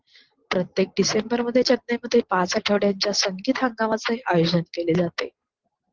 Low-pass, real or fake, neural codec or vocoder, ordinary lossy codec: 7.2 kHz; real; none; Opus, 16 kbps